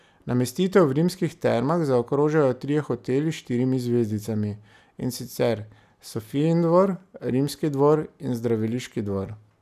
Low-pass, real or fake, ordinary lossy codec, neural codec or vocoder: 14.4 kHz; real; none; none